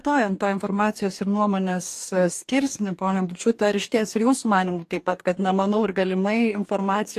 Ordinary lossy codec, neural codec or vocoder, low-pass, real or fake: AAC, 64 kbps; codec, 44.1 kHz, 2.6 kbps, DAC; 14.4 kHz; fake